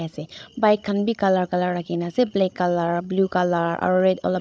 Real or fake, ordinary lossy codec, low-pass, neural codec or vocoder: fake; none; none; codec, 16 kHz, 16 kbps, FreqCodec, larger model